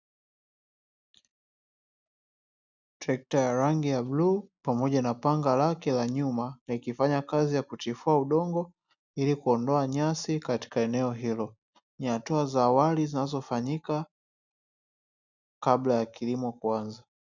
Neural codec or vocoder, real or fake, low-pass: none; real; 7.2 kHz